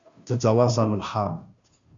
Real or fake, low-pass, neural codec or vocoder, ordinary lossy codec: fake; 7.2 kHz; codec, 16 kHz, 0.5 kbps, FunCodec, trained on Chinese and English, 25 frames a second; MP3, 64 kbps